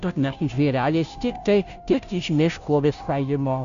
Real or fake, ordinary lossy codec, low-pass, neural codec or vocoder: fake; MP3, 96 kbps; 7.2 kHz; codec, 16 kHz, 0.5 kbps, FunCodec, trained on Chinese and English, 25 frames a second